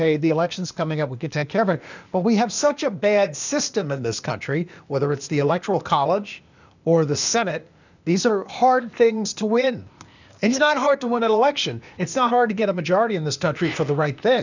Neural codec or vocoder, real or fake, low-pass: codec, 16 kHz, 0.8 kbps, ZipCodec; fake; 7.2 kHz